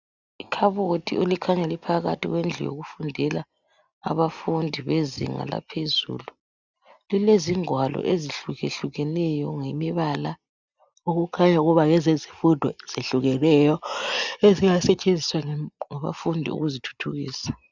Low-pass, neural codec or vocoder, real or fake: 7.2 kHz; none; real